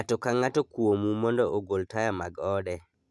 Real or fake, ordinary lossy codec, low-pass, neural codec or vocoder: real; none; none; none